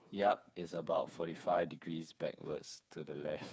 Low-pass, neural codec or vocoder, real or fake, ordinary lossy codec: none; codec, 16 kHz, 4 kbps, FreqCodec, smaller model; fake; none